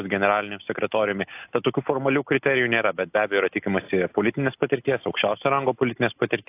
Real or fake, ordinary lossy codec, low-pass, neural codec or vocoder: real; AAC, 32 kbps; 3.6 kHz; none